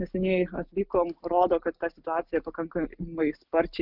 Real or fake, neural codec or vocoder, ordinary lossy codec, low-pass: real; none; Opus, 32 kbps; 5.4 kHz